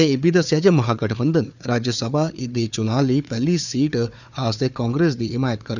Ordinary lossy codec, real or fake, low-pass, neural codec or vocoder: none; fake; 7.2 kHz; codec, 16 kHz, 4 kbps, FunCodec, trained on Chinese and English, 50 frames a second